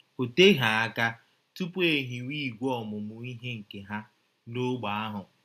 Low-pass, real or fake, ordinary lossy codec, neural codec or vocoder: 14.4 kHz; real; MP3, 96 kbps; none